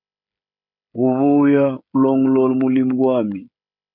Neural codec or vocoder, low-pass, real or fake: codec, 16 kHz, 16 kbps, FreqCodec, smaller model; 5.4 kHz; fake